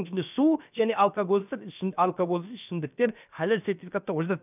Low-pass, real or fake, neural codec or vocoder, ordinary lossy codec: 3.6 kHz; fake; codec, 16 kHz, about 1 kbps, DyCAST, with the encoder's durations; none